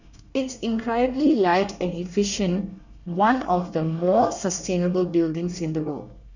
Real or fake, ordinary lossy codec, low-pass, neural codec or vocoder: fake; none; 7.2 kHz; codec, 24 kHz, 1 kbps, SNAC